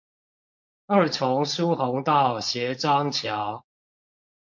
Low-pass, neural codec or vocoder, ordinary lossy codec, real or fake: 7.2 kHz; codec, 16 kHz, 4.8 kbps, FACodec; MP3, 48 kbps; fake